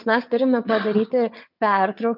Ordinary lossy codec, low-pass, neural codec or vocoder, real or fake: MP3, 48 kbps; 5.4 kHz; vocoder, 22.05 kHz, 80 mel bands, WaveNeXt; fake